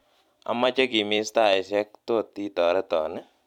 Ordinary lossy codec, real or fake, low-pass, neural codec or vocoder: none; fake; 19.8 kHz; vocoder, 44.1 kHz, 128 mel bands every 256 samples, BigVGAN v2